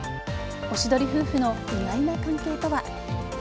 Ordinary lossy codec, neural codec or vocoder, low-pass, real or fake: none; none; none; real